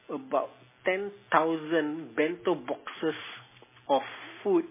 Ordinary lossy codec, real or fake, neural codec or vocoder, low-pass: MP3, 16 kbps; real; none; 3.6 kHz